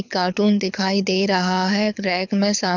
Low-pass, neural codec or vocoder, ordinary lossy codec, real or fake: 7.2 kHz; codec, 24 kHz, 6 kbps, HILCodec; none; fake